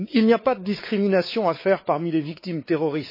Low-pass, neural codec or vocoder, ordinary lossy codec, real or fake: 5.4 kHz; codec, 16 kHz, 4 kbps, X-Codec, WavLM features, trained on Multilingual LibriSpeech; MP3, 24 kbps; fake